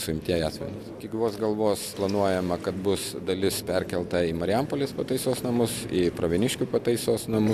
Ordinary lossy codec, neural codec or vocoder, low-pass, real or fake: AAC, 96 kbps; none; 14.4 kHz; real